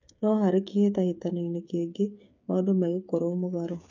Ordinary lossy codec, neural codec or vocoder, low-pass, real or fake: MP3, 64 kbps; codec, 16 kHz, 8 kbps, FreqCodec, smaller model; 7.2 kHz; fake